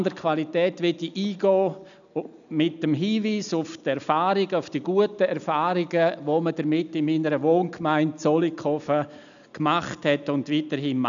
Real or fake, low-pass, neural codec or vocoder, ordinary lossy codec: real; 7.2 kHz; none; none